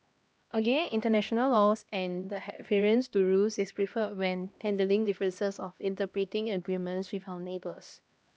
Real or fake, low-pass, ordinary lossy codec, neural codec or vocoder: fake; none; none; codec, 16 kHz, 1 kbps, X-Codec, HuBERT features, trained on LibriSpeech